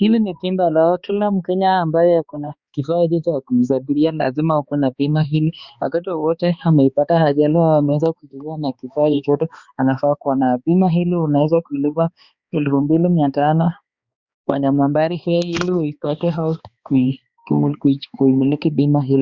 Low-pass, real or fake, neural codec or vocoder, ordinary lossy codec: 7.2 kHz; fake; codec, 16 kHz, 2 kbps, X-Codec, HuBERT features, trained on balanced general audio; Opus, 64 kbps